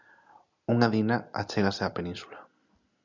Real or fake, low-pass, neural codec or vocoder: real; 7.2 kHz; none